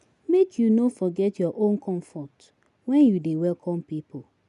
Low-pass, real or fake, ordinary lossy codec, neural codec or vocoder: 10.8 kHz; real; none; none